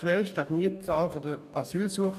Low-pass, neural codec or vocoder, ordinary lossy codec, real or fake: 14.4 kHz; codec, 44.1 kHz, 2.6 kbps, DAC; none; fake